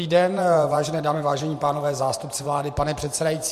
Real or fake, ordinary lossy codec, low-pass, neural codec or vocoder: fake; MP3, 64 kbps; 14.4 kHz; vocoder, 44.1 kHz, 128 mel bands every 512 samples, BigVGAN v2